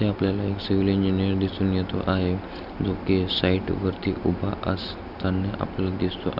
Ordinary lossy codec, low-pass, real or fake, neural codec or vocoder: none; 5.4 kHz; real; none